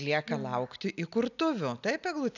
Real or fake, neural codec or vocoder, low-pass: real; none; 7.2 kHz